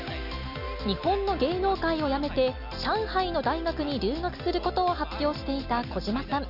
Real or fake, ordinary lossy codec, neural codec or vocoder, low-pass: real; none; none; 5.4 kHz